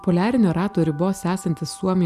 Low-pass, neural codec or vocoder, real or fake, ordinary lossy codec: 14.4 kHz; none; real; Opus, 64 kbps